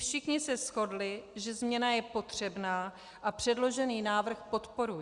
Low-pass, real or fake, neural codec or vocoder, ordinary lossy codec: 10.8 kHz; real; none; Opus, 64 kbps